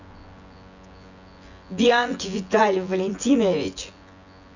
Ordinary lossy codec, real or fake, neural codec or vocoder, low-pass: none; fake; vocoder, 24 kHz, 100 mel bands, Vocos; 7.2 kHz